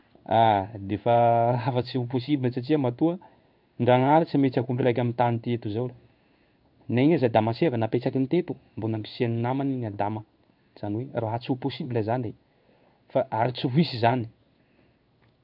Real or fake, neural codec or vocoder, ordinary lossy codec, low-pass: fake; codec, 16 kHz in and 24 kHz out, 1 kbps, XY-Tokenizer; none; 5.4 kHz